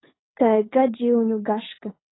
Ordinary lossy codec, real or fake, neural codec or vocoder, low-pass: AAC, 16 kbps; fake; codec, 24 kHz, 6 kbps, HILCodec; 7.2 kHz